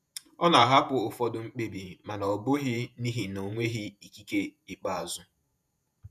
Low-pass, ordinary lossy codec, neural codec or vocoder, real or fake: 14.4 kHz; none; vocoder, 48 kHz, 128 mel bands, Vocos; fake